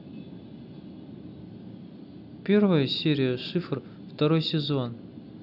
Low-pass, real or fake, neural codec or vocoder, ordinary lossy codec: 5.4 kHz; real; none; none